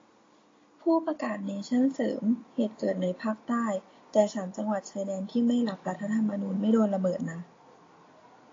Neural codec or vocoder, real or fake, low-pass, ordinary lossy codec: none; real; 7.2 kHz; AAC, 32 kbps